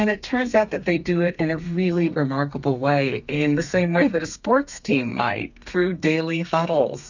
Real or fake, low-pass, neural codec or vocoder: fake; 7.2 kHz; codec, 32 kHz, 1.9 kbps, SNAC